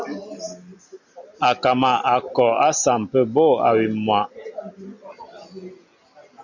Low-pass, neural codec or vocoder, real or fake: 7.2 kHz; none; real